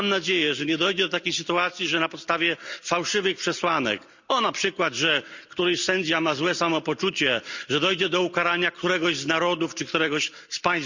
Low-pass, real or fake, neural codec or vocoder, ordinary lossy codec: 7.2 kHz; real; none; Opus, 64 kbps